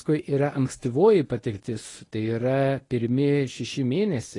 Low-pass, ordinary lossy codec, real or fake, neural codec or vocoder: 10.8 kHz; AAC, 32 kbps; fake; codec, 24 kHz, 0.9 kbps, WavTokenizer, medium speech release version 1